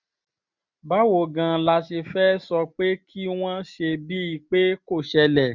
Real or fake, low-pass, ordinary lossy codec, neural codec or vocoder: real; 7.2 kHz; none; none